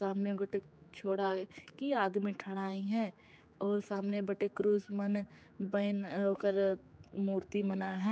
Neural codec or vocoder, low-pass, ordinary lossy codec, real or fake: codec, 16 kHz, 4 kbps, X-Codec, HuBERT features, trained on general audio; none; none; fake